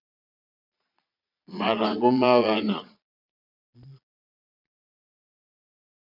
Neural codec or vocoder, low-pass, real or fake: vocoder, 22.05 kHz, 80 mel bands, Vocos; 5.4 kHz; fake